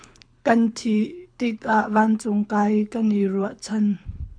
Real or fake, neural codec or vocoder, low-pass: fake; codec, 24 kHz, 6 kbps, HILCodec; 9.9 kHz